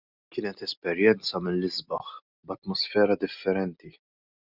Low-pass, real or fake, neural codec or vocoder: 5.4 kHz; real; none